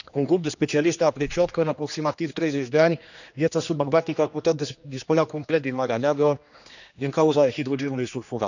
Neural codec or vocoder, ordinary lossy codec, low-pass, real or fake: codec, 16 kHz, 2 kbps, X-Codec, HuBERT features, trained on general audio; none; 7.2 kHz; fake